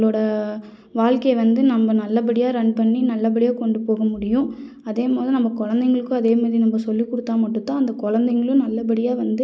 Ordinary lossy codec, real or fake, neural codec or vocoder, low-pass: none; real; none; none